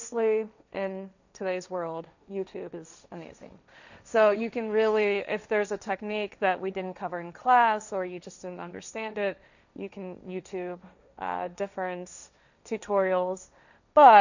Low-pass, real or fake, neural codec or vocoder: 7.2 kHz; fake; codec, 16 kHz, 1.1 kbps, Voila-Tokenizer